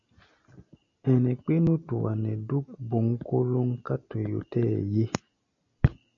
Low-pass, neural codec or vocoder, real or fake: 7.2 kHz; none; real